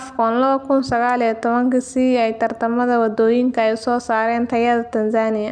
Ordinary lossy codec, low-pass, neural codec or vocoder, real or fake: none; 9.9 kHz; none; real